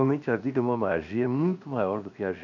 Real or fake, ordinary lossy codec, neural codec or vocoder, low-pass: fake; AAC, 48 kbps; codec, 16 kHz, 0.7 kbps, FocalCodec; 7.2 kHz